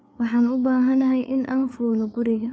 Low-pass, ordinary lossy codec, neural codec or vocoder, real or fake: none; none; codec, 16 kHz, 4 kbps, FreqCodec, larger model; fake